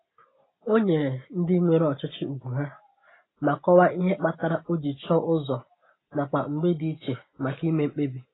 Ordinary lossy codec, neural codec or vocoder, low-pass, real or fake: AAC, 16 kbps; none; 7.2 kHz; real